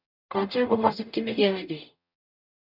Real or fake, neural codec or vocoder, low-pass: fake; codec, 44.1 kHz, 0.9 kbps, DAC; 5.4 kHz